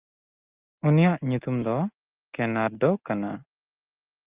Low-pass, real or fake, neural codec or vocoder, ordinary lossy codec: 3.6 kHz; real; none; Opus, 32 kbps